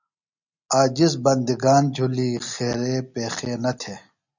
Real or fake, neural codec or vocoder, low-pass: real; none; 7.2 kHz